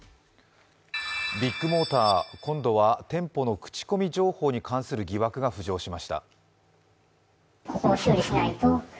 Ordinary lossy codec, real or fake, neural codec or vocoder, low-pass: none; real; none; none